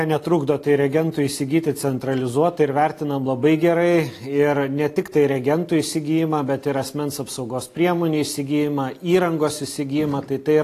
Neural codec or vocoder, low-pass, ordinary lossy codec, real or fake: none; 14.4 kHz; AAC, 48 kbps; real